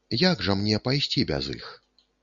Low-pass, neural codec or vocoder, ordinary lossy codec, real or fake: 7.2 kHz; none; Opus, 64 kbps; real